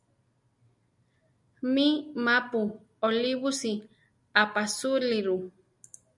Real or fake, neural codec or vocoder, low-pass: real; none; 10.8 kHz